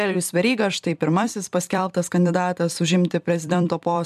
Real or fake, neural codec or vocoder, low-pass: fake; vocoder, 44.1 kHz, 128 mel bands every 256 samples, BigVGAN v2; 14.4 kHz